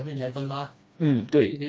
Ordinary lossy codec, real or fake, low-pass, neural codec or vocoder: none; fake; none; codec, 16 kHz, 2 kbps, FreqCodec, smaller model